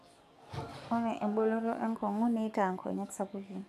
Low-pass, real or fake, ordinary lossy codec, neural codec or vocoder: 14.4 kHz; fake; none; codec, 44.1 kHz, 7.8 kbps, DAC